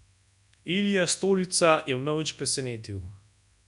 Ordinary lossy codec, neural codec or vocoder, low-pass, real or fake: none; codec, 24 kHz, 0.9 kbps, WavTokenizer, large speech release; 10.8 kHz; fake